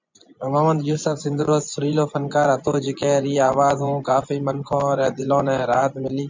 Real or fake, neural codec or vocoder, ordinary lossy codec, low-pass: fake; vocoder, 24 kHz, 100 mel bands, Vocos; MP3, 64 kbps; 7.2 kHz